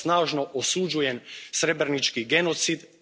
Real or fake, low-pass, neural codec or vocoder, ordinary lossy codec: real; none; none; none